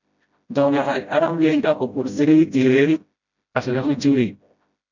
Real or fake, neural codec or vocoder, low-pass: fake; codec, 16 kHz, 0.5 kbps, FreqCodec, smaller model; 7.2 kHz